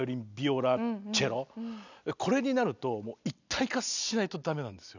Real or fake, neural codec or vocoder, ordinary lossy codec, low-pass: real; none; none; 7.2 kHz